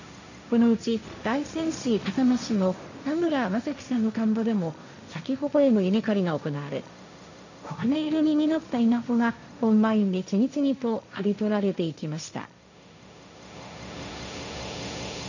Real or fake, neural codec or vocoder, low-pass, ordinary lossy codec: fake; codec, 16 kHz, 1.1 kbps, Voila-Tokenizer; 7.2 kHz; none